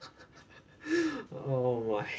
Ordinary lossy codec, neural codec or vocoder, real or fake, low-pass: none; none; real; none